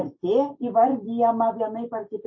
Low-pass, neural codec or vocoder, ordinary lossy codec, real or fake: 7.2 kHz; none; MP3, 32 kbps; real